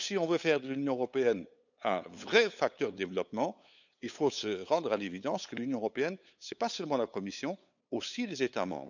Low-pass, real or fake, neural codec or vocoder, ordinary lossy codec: 7.2 kHz; fake; codec, 16 kHz, 8 kbps, FunCodec, trained on LibriTTS, 25 frames a second; none